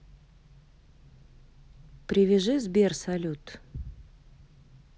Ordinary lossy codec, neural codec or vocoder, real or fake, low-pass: none; none; real; none